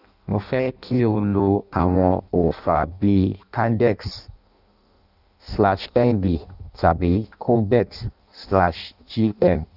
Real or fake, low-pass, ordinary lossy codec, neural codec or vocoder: fake; 5.4 kHz; none; codec, 16 kHz in and 24 kHz out, 0.6 kbps, FireRedTTS-2 codec